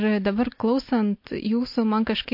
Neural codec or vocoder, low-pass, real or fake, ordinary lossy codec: none; 5.4 kHz; real; MP3, 32 kbps